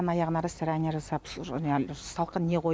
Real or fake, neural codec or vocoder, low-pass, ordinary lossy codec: real; none; none; none